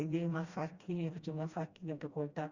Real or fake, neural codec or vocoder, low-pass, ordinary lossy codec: fake; codec, 16 kHz, 1 kbps, FreqCodec, smaller model; 7.2 kHz; Opus, 64 kbps